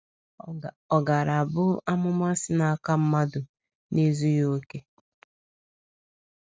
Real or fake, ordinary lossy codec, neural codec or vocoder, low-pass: real; none; none; none